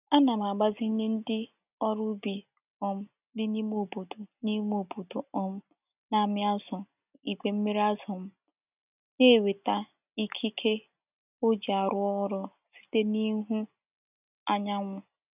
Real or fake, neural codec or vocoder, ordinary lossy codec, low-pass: real; none; none; 3.6 kHz